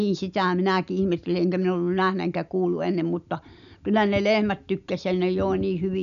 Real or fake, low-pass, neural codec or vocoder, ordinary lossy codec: real; 7.2 kHz; none; none